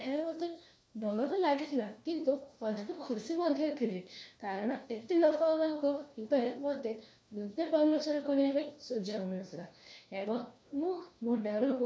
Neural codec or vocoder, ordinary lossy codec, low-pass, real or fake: codec, 16 kHz, 1 kbps, FunCodec, trained on LibriTTS, 50 frames a second; none; none; fake